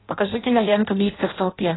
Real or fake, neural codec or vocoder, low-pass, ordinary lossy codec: fake; codec, 16 kHz in and 24 kHz out, 0.6 kbps, FireRedTTS-2 codec; 7.2 kHz; AAC, 16 kbps